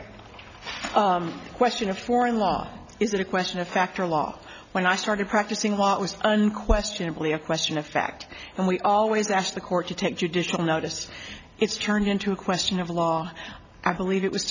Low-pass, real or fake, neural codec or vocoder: 7.2 kHz; real; none